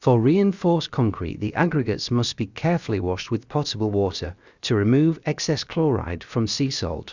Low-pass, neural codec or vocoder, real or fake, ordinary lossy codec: 7.2 kHz; codec, 16 kHz, about 1 kbps, DyCAST, with the encoder's durations; fake; Opus, 64 kbps